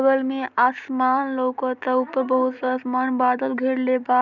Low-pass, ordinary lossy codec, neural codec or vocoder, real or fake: 7.2 kHz; none; none; real